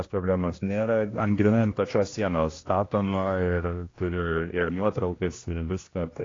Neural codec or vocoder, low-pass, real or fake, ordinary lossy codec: codec, 16 kHz, 1 kbps, X-Codec, HuBERT features, trained on general audio; 7.2 kHz; fake; AAC, 32 kbps